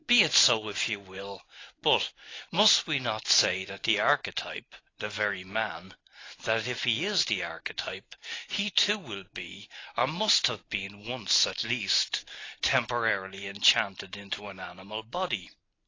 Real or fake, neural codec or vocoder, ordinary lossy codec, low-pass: real; none; AAC, 32 kbps; 7.2 kHz